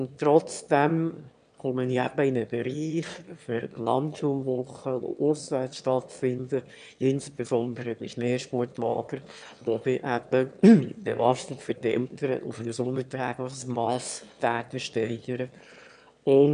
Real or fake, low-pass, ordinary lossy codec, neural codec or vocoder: fake; 9.9 kHz; none; autoencoder, 22.05 kHz, a latent of 192 numbers a frame, VITS, trained on one speaker